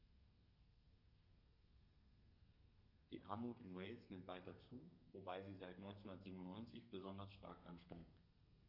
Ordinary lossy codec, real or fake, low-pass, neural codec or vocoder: none; fake; 5.4 kHz; codec, 32 kHz, 1.9 kbps, SNAC